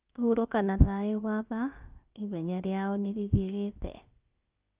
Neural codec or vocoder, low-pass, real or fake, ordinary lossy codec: codec, 16 kHz, about 1 kbps, DyCAST, with the encoder's durations; 3.6 kHz; fake; Opus, 24 kbps